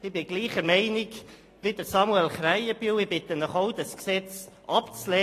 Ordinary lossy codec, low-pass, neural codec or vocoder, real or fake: AAC, 48 kbps; 14.4 kHz; none; real